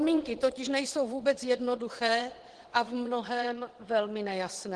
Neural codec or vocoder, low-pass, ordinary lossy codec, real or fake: vocoder, 22.05 kHz, 80 mel bands, WaveNeXt; 9.9 kHz; Opus, 16 kbps; fake